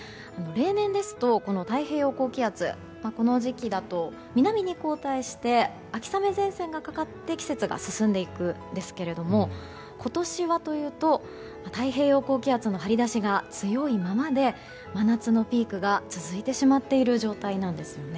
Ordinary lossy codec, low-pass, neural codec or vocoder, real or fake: none; none; none; real